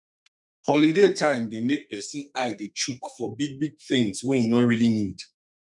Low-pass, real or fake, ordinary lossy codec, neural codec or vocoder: 10.8 kHz; fake; none; codec, 32 kHz, 1.9 kbps, SNAC